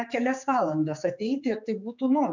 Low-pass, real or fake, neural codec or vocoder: 7.2 kHz; fake; codec, 16 kHz, 4 kbps, X-Codec, HuBERT features, trained on general audio